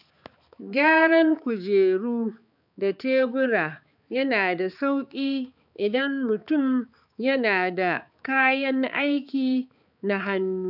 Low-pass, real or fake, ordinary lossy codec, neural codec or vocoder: 5.4 kHz; fake; none; codec, 16 kHz, 4 kbps, X-Codec, HuBERT features, trained on balanced general audio